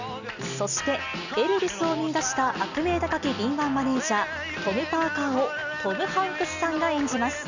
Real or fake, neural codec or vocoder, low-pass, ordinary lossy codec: real; none; 7.2 kHz; none